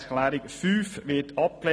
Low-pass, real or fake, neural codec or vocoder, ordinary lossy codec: none; real; none; none